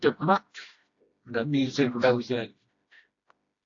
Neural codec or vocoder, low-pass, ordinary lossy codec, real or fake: codec, 16 kHz, 1 kbps, FreqCodec, smaller model; 7.2 kHz; AAC, 48 kbps; fake